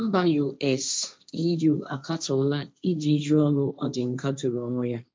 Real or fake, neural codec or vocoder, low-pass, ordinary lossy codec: fake; codec, 16 kHz, 1.1 kbps, Voila-Tokenizer; none; none